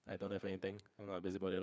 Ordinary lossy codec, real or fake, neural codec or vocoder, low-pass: none; fake; codec, 16 kHz, 4 kbps, FreqCodec, larger model; none